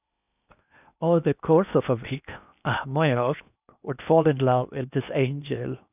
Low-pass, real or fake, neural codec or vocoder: 3.6 kHz; fake; codec, 16 kHz in and 24 kHz out, 0.8 kbps, FocalCodec, streaming, 65536 codes